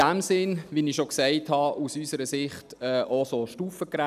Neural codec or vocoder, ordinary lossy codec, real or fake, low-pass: none; none; real; 14.4 kHz